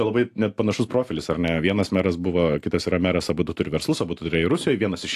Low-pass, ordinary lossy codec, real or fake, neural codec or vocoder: 14.4 kHz; AAC, 64 kbps; real; none